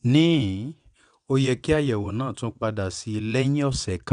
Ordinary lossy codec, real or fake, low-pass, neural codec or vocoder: none; fake; 9.9 kHz; vocoder, 22.05 kHz, 80 mel bands, WaveNeXt